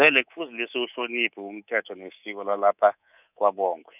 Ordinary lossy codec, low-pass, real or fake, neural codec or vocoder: none; 3.6 kHz; fake; codec, 24 kHz, 3.1 kbps, DualCodec